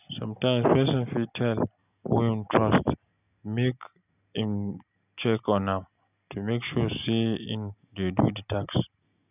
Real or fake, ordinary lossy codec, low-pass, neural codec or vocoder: real; none; 3.6 kHz; none